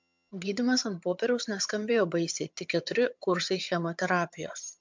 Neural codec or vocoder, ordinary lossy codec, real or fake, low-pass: vocoder, 22.05 kHz, 80 mel bands, HiFi-GAN; MP3, 64 kbps; fake; 7.2 kHz